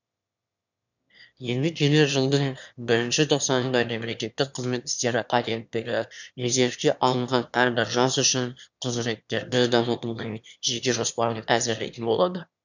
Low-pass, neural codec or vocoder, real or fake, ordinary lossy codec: 7.2 kHz; autoencoder, 22.05 kHz, a latent of 192 numbers a frame, VITS, trained on one speaker; fake; none